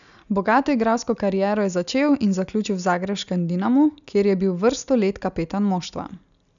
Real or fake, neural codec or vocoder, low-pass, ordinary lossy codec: real; none; 7.2 kHz; none